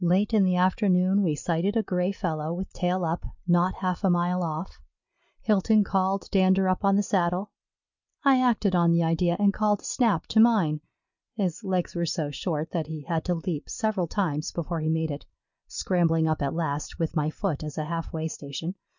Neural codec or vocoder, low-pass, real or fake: none; 7.2 kHz; real